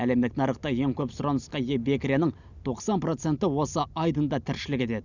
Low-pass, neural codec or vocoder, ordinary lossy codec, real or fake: 7.2 kHz; none; none; real